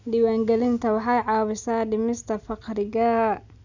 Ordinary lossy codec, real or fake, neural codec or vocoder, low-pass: none; real; none; 7.2 kHz